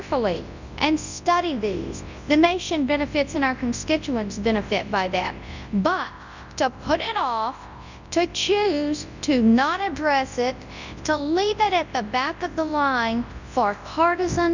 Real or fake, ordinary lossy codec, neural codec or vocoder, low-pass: fake; Opus, 64 kbps; codec, 24 kHz, 0.9 kbps, WavTokenizer, large speech release; 7.2 kHz